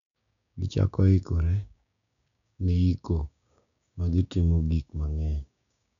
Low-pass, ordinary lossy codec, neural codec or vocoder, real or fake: 7.2 kHz; none; codec, 16 kHz, 6 kbps, DAC; fake